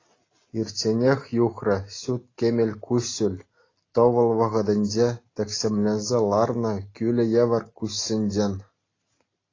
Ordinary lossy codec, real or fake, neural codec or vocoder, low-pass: AAC, 32 kbps; real; none; 7.2 kHz